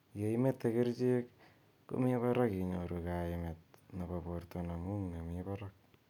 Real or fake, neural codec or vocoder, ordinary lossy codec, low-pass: real; none; none; 19.8 kHz